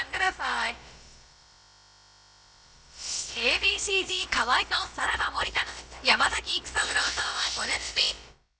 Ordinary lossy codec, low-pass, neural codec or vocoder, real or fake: none; none; codec, 16 kHz, about 1 kbps, DyCAST, with the encoder's durations; fake